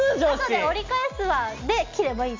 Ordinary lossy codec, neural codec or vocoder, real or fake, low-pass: MP3, 64 kbps; none; real; 7.2 kHz